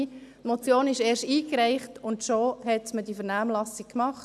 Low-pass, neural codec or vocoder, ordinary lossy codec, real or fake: none; none; none; real